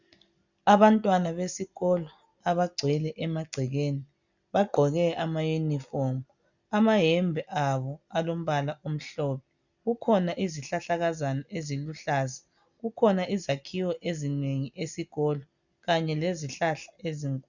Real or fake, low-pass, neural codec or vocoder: real; 7.2 kHz; none